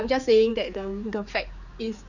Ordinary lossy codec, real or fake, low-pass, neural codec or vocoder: none; fake; 7.2 kHz; codec, 16 kHz, 4 kbps, X-Codec, HuBERT features, trained on general audio